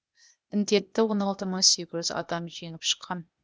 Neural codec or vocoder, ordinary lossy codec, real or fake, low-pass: codec, 16 kHz, 0.8 kbps, ZipCodec; none; fake; none